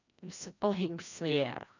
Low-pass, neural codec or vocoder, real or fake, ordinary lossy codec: 7.2 kHz; codec, 16 kHz, 1 kbps, FreqCodec, larger model; fake; none